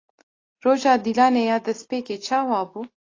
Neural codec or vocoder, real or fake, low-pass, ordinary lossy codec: none; real; 7.2 kHz; AAC, 32 kbps